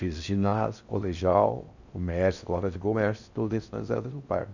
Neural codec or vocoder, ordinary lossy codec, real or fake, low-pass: codec, 16 kHz in and 24 kHz out, 0.6 kbps, FocalCodec, streaming, 4096 codes; none; fake; 7.2 kHz